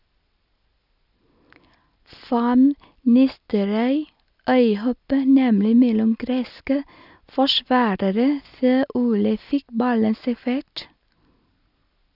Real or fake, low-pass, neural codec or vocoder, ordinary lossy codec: real; 5.4 kHz; none; none